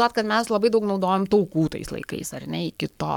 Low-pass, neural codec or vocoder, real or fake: 19.8 kHz; codec, 44.1 kHz, 7.8 kbps, Pupu-Codec; fake